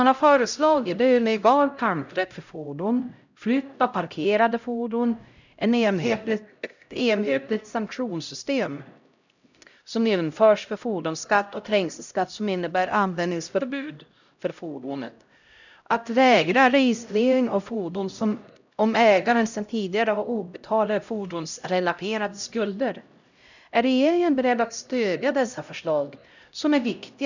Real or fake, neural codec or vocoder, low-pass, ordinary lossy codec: fake; codec, 16 kHz, 0.5 kbps, X-Codec, HuBERT features, trained on LibriSpeech; 7.2 kHz; none